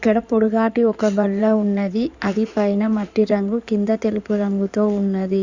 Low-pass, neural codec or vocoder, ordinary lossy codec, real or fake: 7.2 kHz; codec, 16 kHz in and 24 kHz out, 2.2 kbps, FireRedTTS-2 codec; Opus, 64 kbps; fake